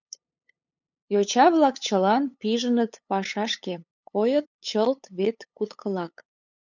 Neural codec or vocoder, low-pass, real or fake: codec, 16 kHz, 8 kbps, FunCodec, trained on LibriTTS, 25 frames a second; 7.2 kHz; fake